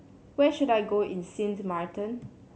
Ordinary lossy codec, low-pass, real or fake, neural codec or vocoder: none; none; real; none